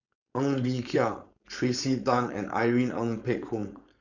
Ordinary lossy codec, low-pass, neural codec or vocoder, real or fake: none; 7.2 kHz; codec, 16 kHz, 4.8 kbps, FACodec; fake